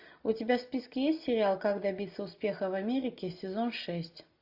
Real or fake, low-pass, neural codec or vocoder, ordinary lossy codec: real; 5.4 kHz; none; AAC, 48 kbps